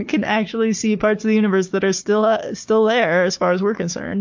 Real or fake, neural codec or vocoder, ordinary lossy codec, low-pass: fake; codec, 16 kHz, 4 kbps, FunCodec, trained on Chinese and English, 50 frames a second; MP3, 48 kbps; 7.2 kHz